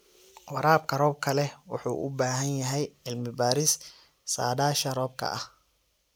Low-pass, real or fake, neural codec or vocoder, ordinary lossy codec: none; real; none; none